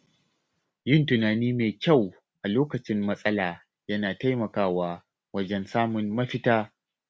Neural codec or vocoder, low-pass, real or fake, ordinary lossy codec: none; none; real; none